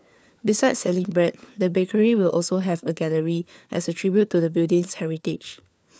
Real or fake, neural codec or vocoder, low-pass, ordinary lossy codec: fake; codec, 16 kHz, 4 kbps, FunCodec, trained on LibriTTS, 50 frames a second; none; none